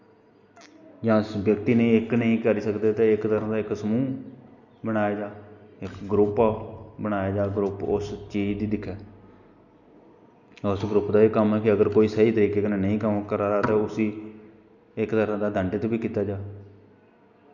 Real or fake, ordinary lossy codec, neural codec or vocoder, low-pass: real; none; none; 7.2 kHz